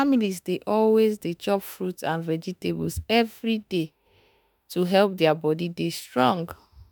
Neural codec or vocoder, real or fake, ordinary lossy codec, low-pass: autoencoder, 48 kHz, 32 numbers a frame, DAC-VAE, trained on Japanese speech; fake; none; none